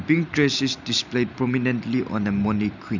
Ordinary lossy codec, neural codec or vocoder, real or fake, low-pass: none; none; real; 7.2 kHz